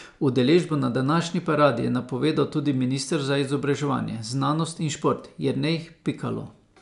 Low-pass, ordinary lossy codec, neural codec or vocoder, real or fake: 10.8 kHz; none; none; real